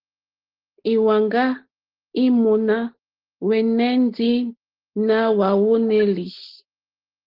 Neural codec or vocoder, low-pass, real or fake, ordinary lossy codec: none; 5.4 kHz; real; Opus, 16 kbps